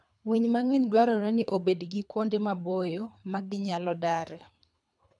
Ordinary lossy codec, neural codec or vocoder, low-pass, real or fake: none; codec, 24 kHz, 3 kbps, HILCodec; 10.8 kHz; fake